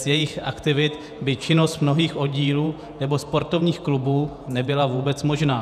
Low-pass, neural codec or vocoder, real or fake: 14.4 kHz; vocoder, 48 kHz, 128 mel bands, Vocos; fake